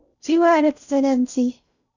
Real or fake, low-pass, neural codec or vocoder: fake; 7.2 kHz; codec, 16 kHz in and 24 kHz out, 0.6 kbps, FocalCodec, streaming, 4096 codes